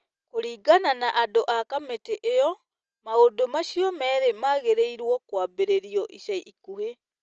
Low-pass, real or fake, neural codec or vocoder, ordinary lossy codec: 7.2 kHz; real; none; Opus, 24 kbps